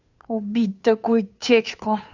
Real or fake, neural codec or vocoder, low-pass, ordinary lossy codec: fake; codec, 16 kHz, 2 kbps, FunCodec, trained on Chinese and English, 25 frames a second; 7.2 kHz; none